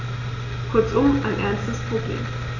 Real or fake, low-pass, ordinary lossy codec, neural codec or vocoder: real; 7.2 kHz; none; none